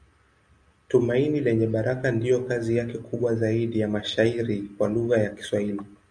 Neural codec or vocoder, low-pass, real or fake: none; 9.9 kHz; real